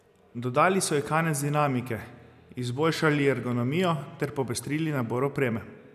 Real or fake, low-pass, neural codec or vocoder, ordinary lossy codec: real; 14.4 kHz; none; none